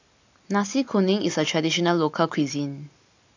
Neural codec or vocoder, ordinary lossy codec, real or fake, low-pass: none; AAC, 48 kbps; real; 7.2 kHz